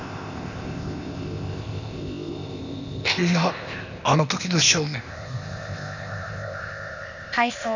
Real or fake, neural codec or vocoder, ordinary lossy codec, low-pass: fake; codec, 16 kHz, 0.8 kbps, ZipCodec; none; 7.2 kHz